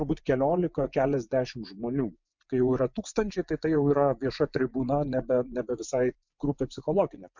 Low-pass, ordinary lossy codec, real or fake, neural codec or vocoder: 7.2 kHz; MP3, 64 kbps; fake; vocoder, 24 kHz, 100 mel bands, Vocos